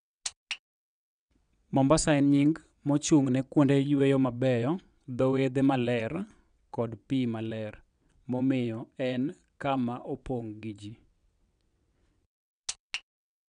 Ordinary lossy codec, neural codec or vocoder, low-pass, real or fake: none; vocoder, 22.05 kHz, 80 mel bands, WaveNeXt; 9.9 kHz; fake